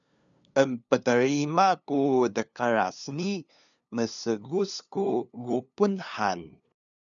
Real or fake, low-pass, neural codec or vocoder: fake; 7.2 kHz; codec, 16 kHz, 2 kbps, FunCodec, trained on LibriTTS, 25 frames a second